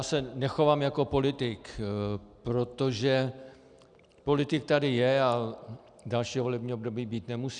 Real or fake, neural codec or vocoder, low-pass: real; none; 9.9 kHz